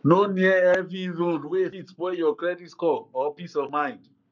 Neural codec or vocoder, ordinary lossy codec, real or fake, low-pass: codec, 44.1 kHz, 7.8 kbps, Pupu-Codec; none; fake; 7.2 kHz